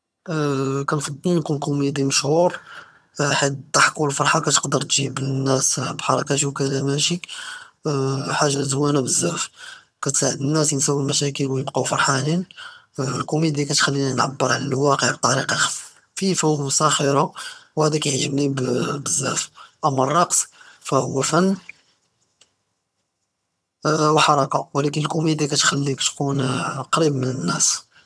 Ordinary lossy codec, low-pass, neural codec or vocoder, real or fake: none; none; vocoder, 22.05 kHz, 80 mel bands, HiFi-GAN; fake